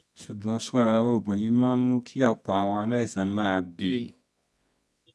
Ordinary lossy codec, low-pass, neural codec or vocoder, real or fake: none; none; codec, 24 kHz, 0.9 kbps, WavTokenizer, medium music audio release; fake